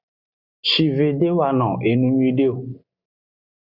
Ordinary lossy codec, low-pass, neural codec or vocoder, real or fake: Opus, 64 kbps; 5.4 kHz; none; real